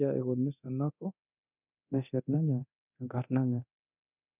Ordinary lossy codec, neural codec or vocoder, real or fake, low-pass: none; codec, 24 kHz, 0.9 kbps, DualCodec; fake; 3.6 kHz